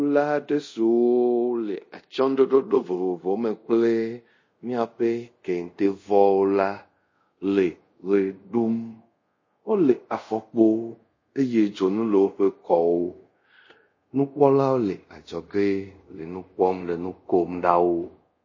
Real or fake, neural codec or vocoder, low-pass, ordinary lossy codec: fake; codec, 24 kHz, 0.5 kbps, DualCodec; 7.2 kHz; MP3, 32 kbps